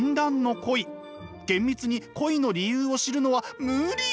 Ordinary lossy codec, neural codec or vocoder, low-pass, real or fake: none; none; none; real